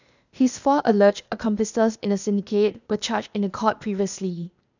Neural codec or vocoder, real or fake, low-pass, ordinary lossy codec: codec, 16 kHz, 0.8 kbps, ZipCodec; fake; 7.2 kHz; none